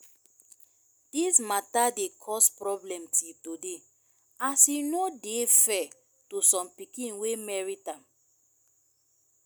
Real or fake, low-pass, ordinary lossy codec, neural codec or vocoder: real; none; none; none